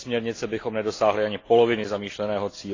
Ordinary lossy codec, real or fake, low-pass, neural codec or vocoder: AAC, 32 kbps; real; 7.2 kHz; none